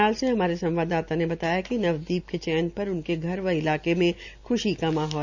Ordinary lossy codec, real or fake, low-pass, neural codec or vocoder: Opus, 64 kbps; real; 7.2 kHz; none